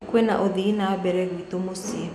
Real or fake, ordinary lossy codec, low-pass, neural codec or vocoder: real; none; none; none